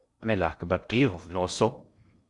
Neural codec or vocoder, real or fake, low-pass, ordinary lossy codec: codec, 16 kHz in and 24 kHz out, 0.6 kbps, FocalCodec, streaming, 2048 codes; fake; 10.8 kHz; Opus, 64 kbps